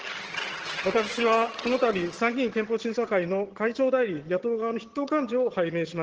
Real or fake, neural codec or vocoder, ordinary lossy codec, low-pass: fake; vocoder, 22.05 kHz, 80 mel bands, HiFi-GAN; Opus, 16 kbps; 7.2 kHz